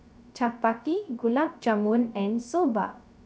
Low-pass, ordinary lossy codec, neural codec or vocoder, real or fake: none; none; codec, 16 kHz, 0.3 kbps, FocalCodec; fake